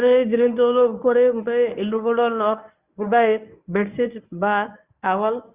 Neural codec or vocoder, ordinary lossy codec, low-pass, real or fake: codec, 24 kHz, 0.9 kbps, WavTokenizer, medium speech release version 1; Opus, 64 kbps; 3.6 kHz; fake